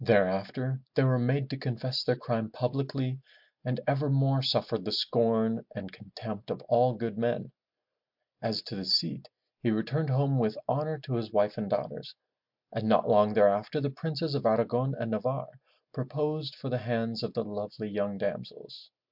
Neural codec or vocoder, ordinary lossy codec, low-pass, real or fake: none; MP3, 48 kbps; 5.4 kHz; real